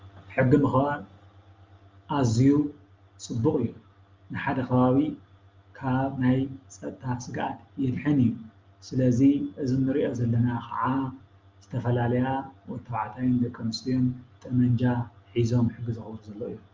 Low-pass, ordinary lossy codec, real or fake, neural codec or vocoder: 7.2 kHz; Opus, 32 kbps; real; none